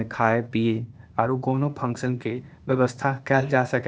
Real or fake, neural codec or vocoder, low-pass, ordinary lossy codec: fake; codec, 16 kHz, 0.8 kbps, ZipCodec; none; none